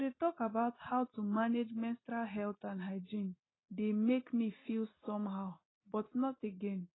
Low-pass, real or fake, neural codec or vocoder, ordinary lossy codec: 7.2 kHz; real; none; AAC, 16 kbps